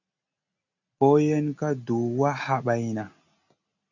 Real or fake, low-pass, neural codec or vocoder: real; 7.2 kHz; none